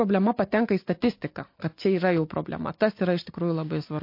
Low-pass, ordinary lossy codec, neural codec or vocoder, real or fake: 5.4 kHz; MP3, 32 kbps; none; real